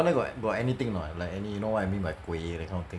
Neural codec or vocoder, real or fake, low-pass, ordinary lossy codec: none; real; none; none